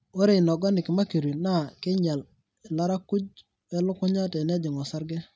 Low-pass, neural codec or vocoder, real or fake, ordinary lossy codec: none; none; real; none